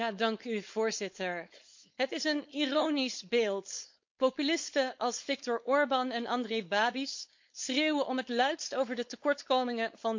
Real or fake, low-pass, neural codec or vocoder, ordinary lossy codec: fake; 7.2 kHz; codec, 16 kHz, 4.8 kbps, FACodec; MP3, 48 kbps